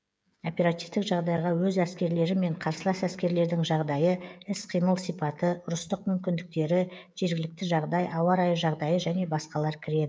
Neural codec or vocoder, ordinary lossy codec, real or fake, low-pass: codec, 16 kHz, 16 kbps, FreqCodec, smaller model; none; fake; none